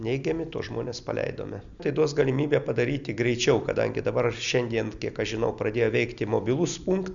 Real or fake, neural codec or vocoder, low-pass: real; none; 7.2 kHz